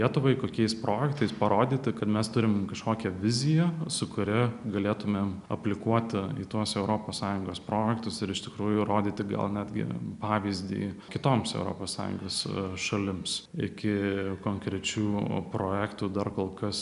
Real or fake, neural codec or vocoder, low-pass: real; none; 10.8 kHz